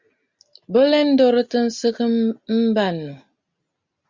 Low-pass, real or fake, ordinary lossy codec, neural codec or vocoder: 7.2 kHz; real; Opus, 64 kbps; none